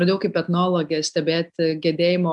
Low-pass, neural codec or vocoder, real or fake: 10.8 kHz; none; real